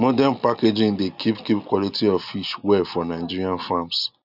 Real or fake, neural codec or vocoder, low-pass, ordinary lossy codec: real; none; 5.4 kHz; none